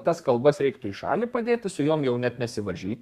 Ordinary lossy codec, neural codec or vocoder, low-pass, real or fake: Opus, 64 kbps; codec, 32 kHz, 1.9 kbps, SNAC; 14.4 kHz; fake